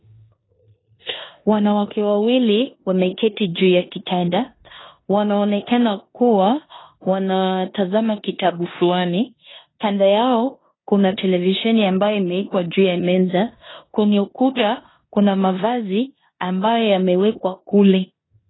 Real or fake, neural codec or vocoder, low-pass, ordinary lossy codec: fake; codec, 16 kHz in and 24 kHz out, 0.9 kbps, LongCat-Audio-Codec, four codebook decoder; 7.2 kHz; AAC, 16 kbps